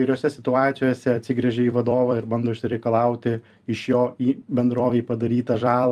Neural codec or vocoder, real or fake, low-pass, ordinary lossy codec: vocoder, 44.1 kHz, 128 mel bands every 256 samples, BigVGAN v2; fake; 14.4 kHz; Opus, 32 kbps